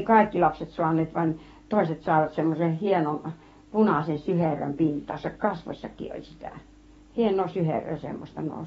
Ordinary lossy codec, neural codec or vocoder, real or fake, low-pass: AAC, 24 kbps; codec, 44.1 kHz, 7.8 kbps, DAC; fake; 19.8 kHz